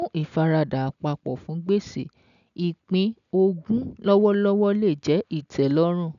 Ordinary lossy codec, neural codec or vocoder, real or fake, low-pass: none; none; real; 7.2 kHz